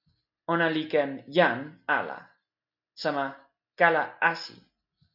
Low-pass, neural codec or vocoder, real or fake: 5.4 kHz; none; real